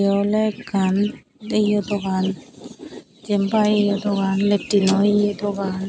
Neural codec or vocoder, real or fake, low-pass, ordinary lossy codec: none; real; none; none